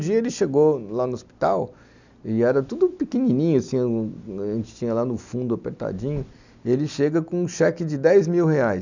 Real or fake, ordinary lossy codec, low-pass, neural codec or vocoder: real; none; 7.2 kHz; none